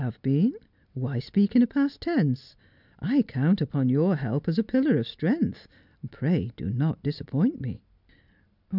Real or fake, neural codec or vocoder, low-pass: real; none; 5.4 kHz